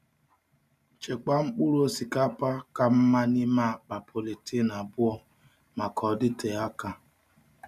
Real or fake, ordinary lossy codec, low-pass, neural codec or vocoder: real; none; 14.4 kHz; none